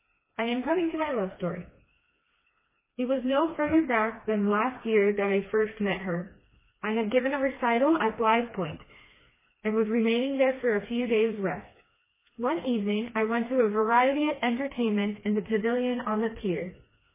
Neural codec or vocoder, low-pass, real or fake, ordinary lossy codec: codec, 16 kHz, 2 kbps, FreqCodec, smaller model; 3.6 kHz; fake; MP3, 16 kbps